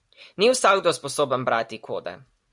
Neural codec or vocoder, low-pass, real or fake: none; 10.8 kHz; real